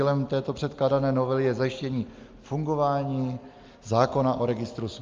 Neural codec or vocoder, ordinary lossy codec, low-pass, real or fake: none; Opus, 32 kbps; 7.2 kHz; real